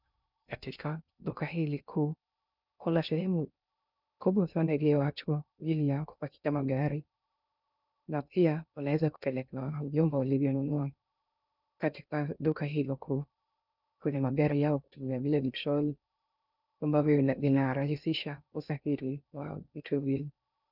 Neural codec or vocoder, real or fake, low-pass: codec, 16 kHz in and 24 kHz out, 0.6 kbps, FocalCodec, streaming, 2048 codes; fake; 5.4 kHz